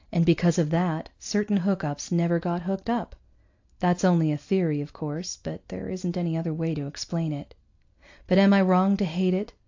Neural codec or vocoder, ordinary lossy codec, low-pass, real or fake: none; AAC, 48 kbps; 7.2 kHz; real